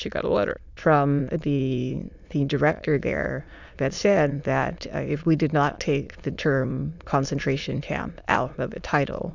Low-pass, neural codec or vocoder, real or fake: 7.2 kHz; autoencoder, 22.05 kHz, a latent of 192 numbers a frame, VITS, trained on many speakers; fake